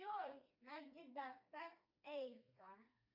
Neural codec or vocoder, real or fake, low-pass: codec, 16 kHz, 2 kbps, FreqCodec, smaller model; fake; 5.4 kHz